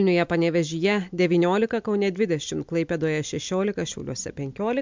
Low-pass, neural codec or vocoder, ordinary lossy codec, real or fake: 7.2 kHz; none; MP3, 64 kbps; real